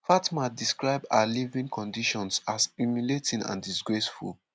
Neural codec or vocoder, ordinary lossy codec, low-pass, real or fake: none; none; none; real